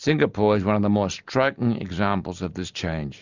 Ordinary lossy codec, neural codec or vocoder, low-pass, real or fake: Opus, 64 kbps; none; 7.2 kHz; real